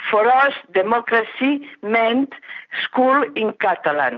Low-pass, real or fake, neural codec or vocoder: 7.2 kHz; real; none